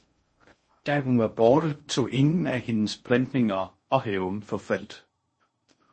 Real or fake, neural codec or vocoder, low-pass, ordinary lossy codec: fake; codec, 16 kHz in and 24 kHz out, 0.6 kbps, FocalCodec, streaming, 2048 codes; 9.9 kHz; MP3, 32 kbps